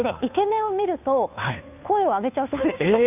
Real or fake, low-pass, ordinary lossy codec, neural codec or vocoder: fake; 3.6 kHz; none; codec, 16 kHz, 2 kbps, FunCodec, trained on Chinese and English, 25 frames a second